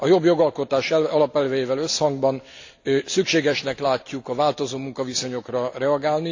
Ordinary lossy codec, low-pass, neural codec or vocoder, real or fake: AAC, 48 kbps; 7.2 kHz; none; real